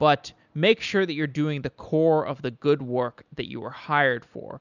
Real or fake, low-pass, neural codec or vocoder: real; 7.2 kHz; none